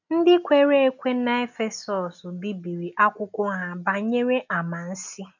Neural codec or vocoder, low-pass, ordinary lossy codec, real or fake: none; 7.2 kHz; none; real